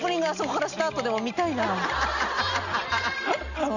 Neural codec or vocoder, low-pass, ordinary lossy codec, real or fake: none; 7.2 kHz; none; real